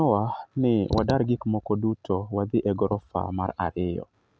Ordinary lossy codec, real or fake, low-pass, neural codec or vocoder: none; real; none; none